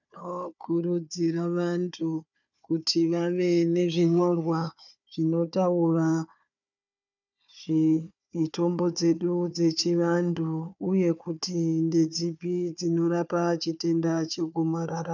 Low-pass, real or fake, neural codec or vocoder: 7.2 kHz; fake; codec, 16 kHz, 4 kbps, FunCodec, trained on Chinese and English, 50 frames a second